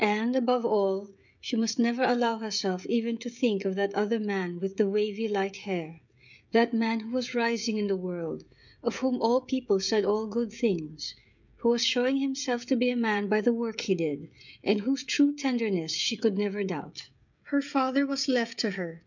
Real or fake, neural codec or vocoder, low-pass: fake; codec, 16 kHz, 16 kbps, FreqCodec, smaller model; 7.2 kHz